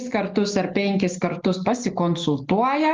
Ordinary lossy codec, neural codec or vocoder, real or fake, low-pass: Opus, 32 kbps; none; real; 7.2 kHz